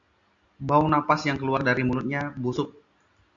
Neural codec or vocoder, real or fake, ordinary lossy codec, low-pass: none; real; AAC, 64 kbps; 7.2 kHz